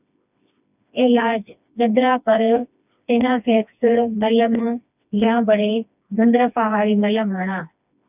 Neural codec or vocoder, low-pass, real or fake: codec, 16 kHz, 2 kbps, FreqCodec, smaller model; 3.6 kHz; fake